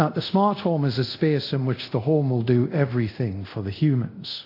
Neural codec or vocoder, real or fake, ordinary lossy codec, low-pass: codec, 24 kHz, 0.5 kbps, DualCodec; fake; AAC, 32 kbps; 5.4 kHz